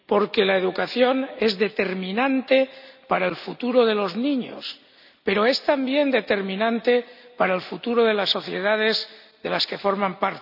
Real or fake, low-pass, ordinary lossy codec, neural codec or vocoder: real; 5.4 kHz; none; none